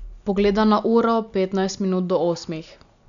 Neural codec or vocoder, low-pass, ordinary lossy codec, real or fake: none; 7.2 kHz; none; real